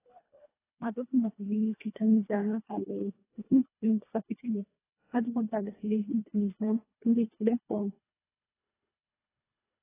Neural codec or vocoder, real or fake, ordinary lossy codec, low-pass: codec, 24 kHz, 1.5 kbps, HILCodec; fake; AAC, 16 kbps; 3.6 kHz